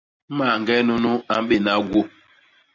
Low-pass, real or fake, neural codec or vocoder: 7.2 kHz; real; none